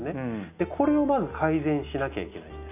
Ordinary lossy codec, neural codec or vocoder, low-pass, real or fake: none; none; 3.6 kHz; real